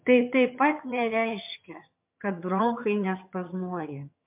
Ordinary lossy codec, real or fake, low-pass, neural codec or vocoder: MP3, 32 kbps; fake; 3.6 kHz; vocoder, 22.05 kHz, 80 mel bands, HiFi-GAN